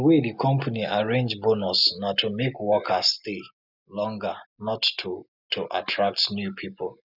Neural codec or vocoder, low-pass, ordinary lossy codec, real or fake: none; 5.4 kHz; none; real